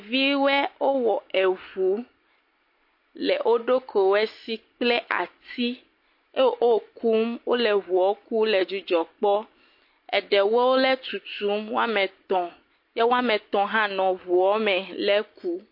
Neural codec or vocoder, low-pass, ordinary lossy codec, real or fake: none; 5.4 kHz; MP3, 32 kbps; real